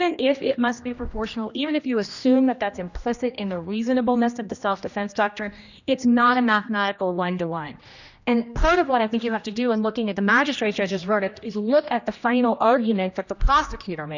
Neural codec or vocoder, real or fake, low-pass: codec, 16 kHz, 1 kbps, X-Codec, HuBERT features, trained on general audio; fake; 7.2 kHz